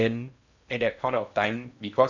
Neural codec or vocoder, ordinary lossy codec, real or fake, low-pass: codec, 16 kHz in and 24 kHz out, 0.8 kbps, FocalCodec, streaming, 65536 codes; none; fake; 7.2 kHz